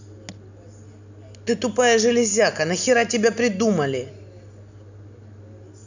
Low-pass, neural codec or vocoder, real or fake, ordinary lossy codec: 7.2 kHz; none; real; none